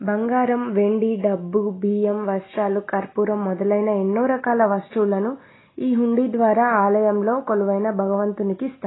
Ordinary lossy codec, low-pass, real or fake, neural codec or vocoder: AAC, 16 kbps; 7.2 kHz; real; none